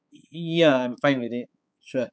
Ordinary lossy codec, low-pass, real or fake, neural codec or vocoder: none; none; fake; codec, 16 kHz, 4 kbps, X-Codec, HuBERT features, trained on balanced general audio